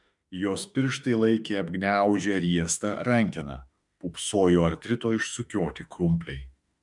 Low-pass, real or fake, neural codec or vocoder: 10.8 kHz; fake; autoencoder, 48 kHz, 32 numbers a frame, DAC-VAE, trained on Japanese speech